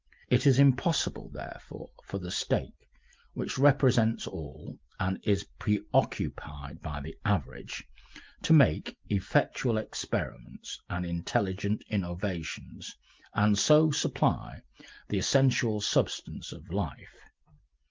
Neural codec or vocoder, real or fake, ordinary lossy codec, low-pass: none; real; Opus, 32 kbps; 7.2 kHz